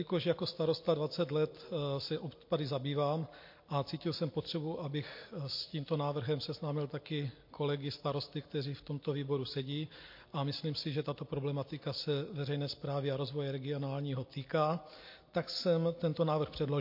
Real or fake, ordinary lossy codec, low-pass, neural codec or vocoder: real; MP3, 32 kbps; 5.4 kHz; none